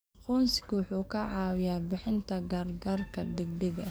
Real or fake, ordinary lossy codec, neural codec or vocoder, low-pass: fake; none; codec, 44.1 kHz, 7.8 kbps, DAC; none